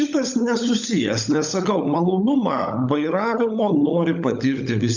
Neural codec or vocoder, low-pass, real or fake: codec, 16 kHz, 16 kbps, FunCodec, trained on LibriTTS, 50 frames a second; 7.2 kHz; fake